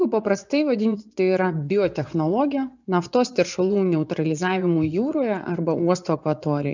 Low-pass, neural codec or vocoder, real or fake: 7.2 kHz; vocoder, 24 kHz, 100 mel bands, Vocos; fake